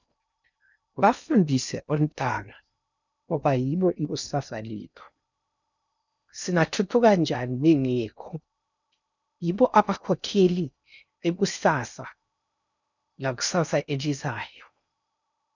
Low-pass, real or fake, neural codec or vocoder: 7.2 kHz; fake; codec, 16 kHz in and 24 kHz out, 0.6 kbps, FocalCodec, streaming, 2048 codes